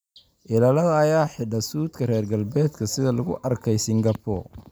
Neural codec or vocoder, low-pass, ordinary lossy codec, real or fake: none; none; none; real